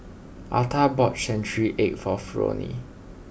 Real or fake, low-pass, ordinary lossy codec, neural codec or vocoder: real; none; none; none